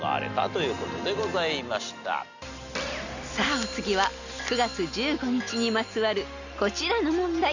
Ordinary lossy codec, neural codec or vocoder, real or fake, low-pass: none; none; real; 7.2 kHz